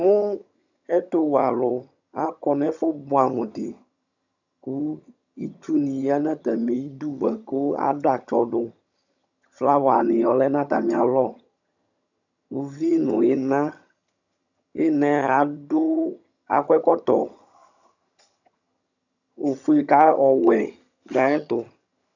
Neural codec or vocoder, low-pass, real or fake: vocoder, 22.05 kHz, 80 mel bands, HiFi-GAN; 7.2 kHz; fake